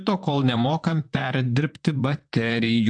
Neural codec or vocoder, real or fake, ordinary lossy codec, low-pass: vocoder, 44.1 kHz, 128 mel bands, Pupu-Vocoder; fake; AAC, 64 kbps; 9.9 kHz